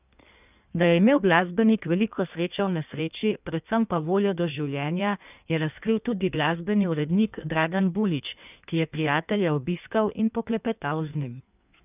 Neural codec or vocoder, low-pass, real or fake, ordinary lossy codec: codec, 16 kHz in and 24 kHz out, 1.1 kbps, FireRedTTS-2 codec; 3.6 kHz; fake; none